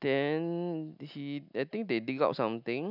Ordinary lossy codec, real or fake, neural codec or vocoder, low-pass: none; real; none; 5.4 kHz